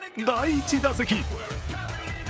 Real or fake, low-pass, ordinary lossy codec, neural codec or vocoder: fake; none; none; codec, 16 kHz, 16 kbps, FreqCodec, smaller model